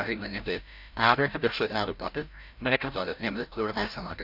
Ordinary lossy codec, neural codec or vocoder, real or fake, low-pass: none; codec, 16 kHz, 0.5 kbps, FreqCodec, larger model; fake; 5.4 kHz